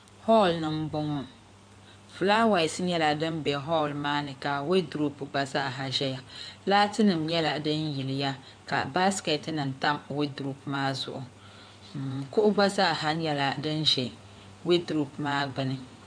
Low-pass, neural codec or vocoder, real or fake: 9.9 kHz; codec, 16 kHz in and 24 kHz out, 2.2 kbps, FireRedTTS-2 codec; fake